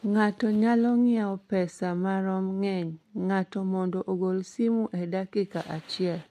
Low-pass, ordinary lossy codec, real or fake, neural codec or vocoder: 14.4 kHz; MP3, 64 kbps; real; none